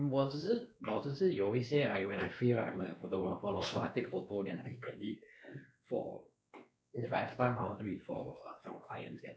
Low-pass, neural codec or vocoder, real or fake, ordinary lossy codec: none; codec, 16 kHz, 2 kbps, X-Codec, WavLM features, trained on Multilingual LibriSpeech; fake; none